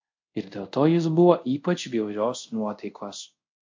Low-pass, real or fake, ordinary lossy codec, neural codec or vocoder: 7.2 kHz; fake; MP3, 48 kbps; codec, 24 kHz, 0.5 kbps, DualCodec